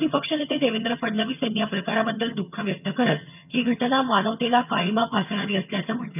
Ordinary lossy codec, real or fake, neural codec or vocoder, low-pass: none; fake; vocoder, 22.05 kHz, 80 mel bands, HiFi-GAN; 3.6 kHz